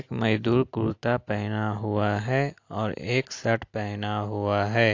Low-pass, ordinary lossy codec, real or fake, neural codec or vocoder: 7.2 kHz; AAC, 48 kbps; real; none